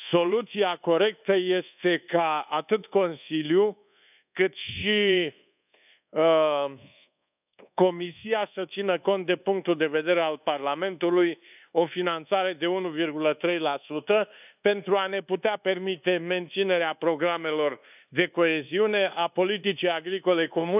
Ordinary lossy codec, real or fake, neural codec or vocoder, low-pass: none; fake; codec, 24 kHz, 1.2 kbps, DualCodec; 3.6 kHz